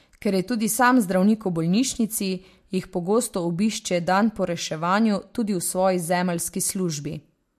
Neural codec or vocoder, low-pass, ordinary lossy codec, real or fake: none; 14.4 kHz; MP3, 64 kbps; real